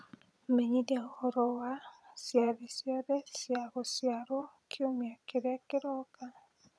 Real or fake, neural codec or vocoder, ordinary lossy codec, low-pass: fake; vocoder, 22.05 kHz, 80 mel bands, Vocos; none; none